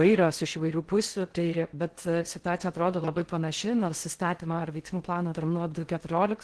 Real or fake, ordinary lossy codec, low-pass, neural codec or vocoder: fake; Opus, 16 kbps; 10.8 kHz; codec, 16 kHz in and 24 kHz out, 0.6 kbps, FocalCodec, streaming, 4096 codes